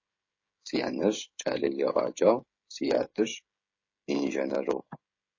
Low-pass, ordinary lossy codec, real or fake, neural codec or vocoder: 7.2 kHz; MP3, 32 kbps; fake; codec, 16 kHz, 16 kbps, FreqCodec, smaller model